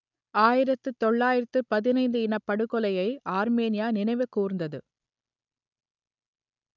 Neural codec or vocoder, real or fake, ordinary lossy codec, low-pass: none; real; none; 7.2 kHz